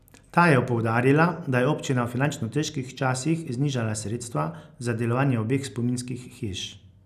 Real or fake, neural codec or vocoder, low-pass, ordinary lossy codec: real; none; 14.4 kHz; none